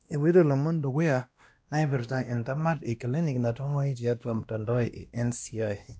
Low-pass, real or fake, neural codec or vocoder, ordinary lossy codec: none; fake; codec, 16 kHz, 1 kbps, X-Codec, WavLM features, trained on Multilingual LibriSpeech; none